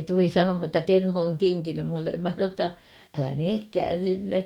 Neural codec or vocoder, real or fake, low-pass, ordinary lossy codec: codec, 44.1 kHz, 2.6 kbps, DAC; fake; 19.8 kHz; none